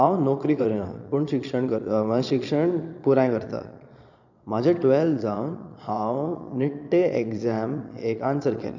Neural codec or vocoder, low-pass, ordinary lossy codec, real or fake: vocoder, 22.05 kHz, 80 mel bands, Vocos; 7.2 kHz; none; fake